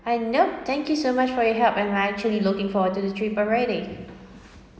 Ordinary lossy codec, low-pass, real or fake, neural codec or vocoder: none; none; real; none